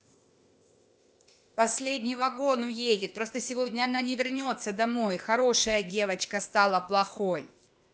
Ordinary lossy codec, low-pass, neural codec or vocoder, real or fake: none; none; codec, 16 kHz, 0.8 kbps, ZipCodec; fake